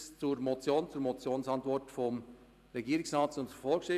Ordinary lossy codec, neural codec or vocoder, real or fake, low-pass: none; vocoder, 44.1 kHz, 128 mel bands every 512 samples, BigVGAN v2; fake; 14.4 kHz